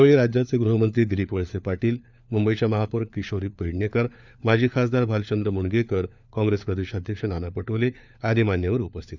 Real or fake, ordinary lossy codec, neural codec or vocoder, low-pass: fake; none; codec, 16 kHz, 4 kbps, FunCodec, trained on LibriTTS, 50 frames a second; 7.2 kHz